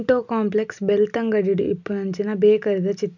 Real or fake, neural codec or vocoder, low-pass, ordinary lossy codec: real; none; 7.2 kHz; none